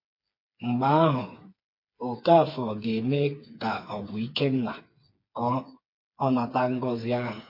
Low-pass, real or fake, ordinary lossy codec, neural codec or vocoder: 5.4 kHz; fake; MP3, 32 kbps; codec, 16 kHz, 4 kbps, FreqCodec, smaller model